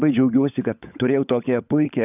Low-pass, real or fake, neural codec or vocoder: 3.6 kHz; fake; codec, 16 kHz, 16 kbps, FunCodec, trained on LibriTTS, 50 frames a second